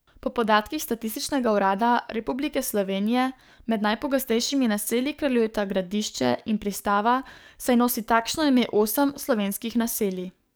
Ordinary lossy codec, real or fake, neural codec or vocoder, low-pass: none; fake; codec, 44.1 kHz, 7.8 kbps, DAC; none